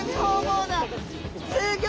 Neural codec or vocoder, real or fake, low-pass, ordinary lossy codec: none; real; none; none